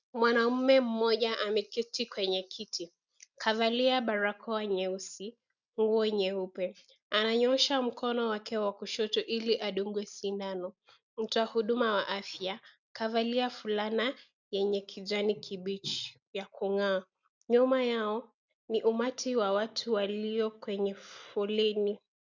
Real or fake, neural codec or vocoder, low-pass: real; none; 7.2 kHz